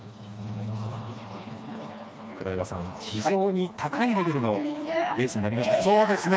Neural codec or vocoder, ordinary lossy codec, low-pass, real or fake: codec, 16 kHz, 2 kbps, FreqCodec, smaller model; none; none; fake